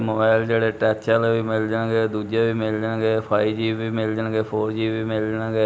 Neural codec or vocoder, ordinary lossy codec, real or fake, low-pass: none; none; real; none